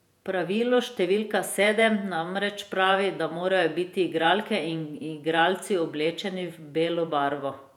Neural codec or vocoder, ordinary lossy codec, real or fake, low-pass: vocoder, 48 kHz, 128 mel bands, Vocos; none; fake; 19.8 kHz